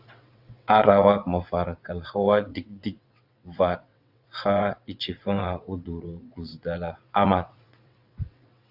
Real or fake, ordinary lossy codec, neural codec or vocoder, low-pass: fake; AAC, 48 kbps; vocoder, 22.05 kHz, 80 mel bands, WaveNeXt; 5.4 kHz